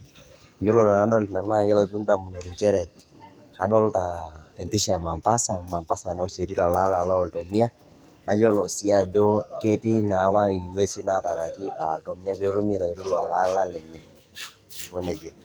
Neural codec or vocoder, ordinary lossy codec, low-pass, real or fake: codec, 44.1 kHz, 2.6 kbps, SNAC; none; none; fake